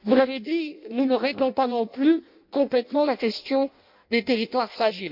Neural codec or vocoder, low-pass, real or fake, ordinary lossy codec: codec, 16 kHz in and 24 kHz out, 0.6 kbps, FireRedTTS-2 codec; 5.4 kHz; fake; MP3, 48 kbps